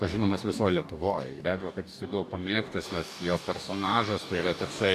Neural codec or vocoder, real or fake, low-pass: codec, 44.1 kHz, 2.6 kbps, DAC; fake; 14.4 kHz